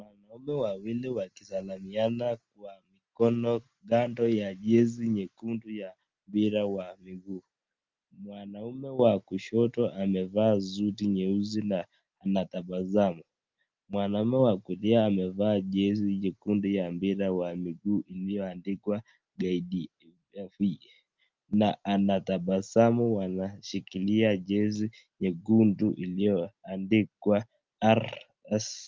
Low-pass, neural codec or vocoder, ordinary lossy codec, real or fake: 7.2 kHz; none; Opus, 32 kbps; real